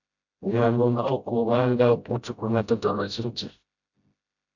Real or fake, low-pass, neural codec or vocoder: fake; 7.2 kHz; codec, 16 kHz, 0.5 kbps, FreqCodec, smaller model